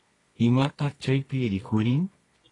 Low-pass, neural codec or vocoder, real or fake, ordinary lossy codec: 10.8 kHz; codec, 24 kHz, 0.9 kbps, WavTokenizer, medium music audio release; fake; AAC, 32 kbps